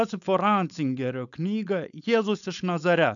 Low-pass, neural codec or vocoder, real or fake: 7.2 kHz; codec, 16 kHz, 4.8 kbps, FACodec; fake